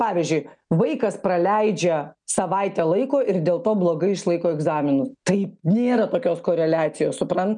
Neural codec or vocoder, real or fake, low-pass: none; real; 9.9 kHz